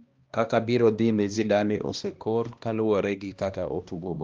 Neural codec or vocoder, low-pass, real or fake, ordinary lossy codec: codec, 16 kHz, 1 kbps, X-Codec, HuBERT features, trained on balanced general audio; 7.2 kHz; fake; Opus, 32 kbps